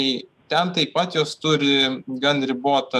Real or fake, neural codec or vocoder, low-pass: fake; autoencoder, 48 kHz, 128 numbers a frame, DAC-VAE, trained on Japanese speech; 14.4 kHz